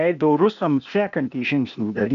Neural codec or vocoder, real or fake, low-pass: codec, 16 kHz, 0.8 kbps, ZipCodec; fake; 7.2 kHz